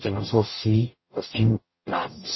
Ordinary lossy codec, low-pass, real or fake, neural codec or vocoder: MP3, 24 kbps; 7.2 kHz; fake; codec, 44.1 kHz, 0.9 kbps, DAC